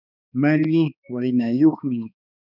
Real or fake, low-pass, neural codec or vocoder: fake; 5.4 kHz; codec, 16 kHz, 2 kbps, X-Codec, HuBERT features, trained on balanced general audio